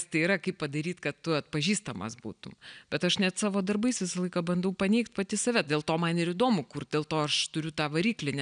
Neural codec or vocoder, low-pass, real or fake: none; 9.9 kHz; real